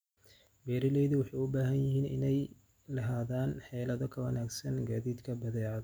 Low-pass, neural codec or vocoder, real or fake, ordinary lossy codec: none; none; real; none